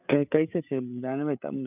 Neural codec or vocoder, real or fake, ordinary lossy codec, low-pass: codec, 16 kHz, 8 kbps, FreqCodec, larger model; fake; none; 3.6 kHz